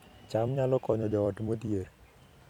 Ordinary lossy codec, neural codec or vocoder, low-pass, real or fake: none; vocoder, 44.1 kHz, 128 mel bands every 256 samples, BigVGAN v2; 19.8 kHz; fake